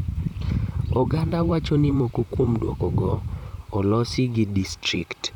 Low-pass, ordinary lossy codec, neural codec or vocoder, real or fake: 19.8 kHz; none; vocoder, 44.1 kHz, 128 mel bands, Pupu-Vocoder; fake